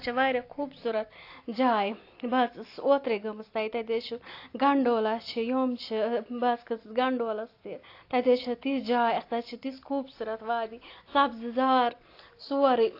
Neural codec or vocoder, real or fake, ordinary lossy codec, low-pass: none; real; AAC, 32 kbps; 5.4 kHz